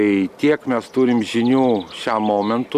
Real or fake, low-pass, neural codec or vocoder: real; 14.4 kHz; none